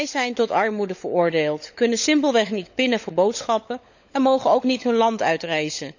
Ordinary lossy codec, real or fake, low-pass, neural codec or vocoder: none; fake; 7.2 kHz; codec, 16 kHz, 16 kbps, FunCodec, trained on LibriTTS, 50 frames a second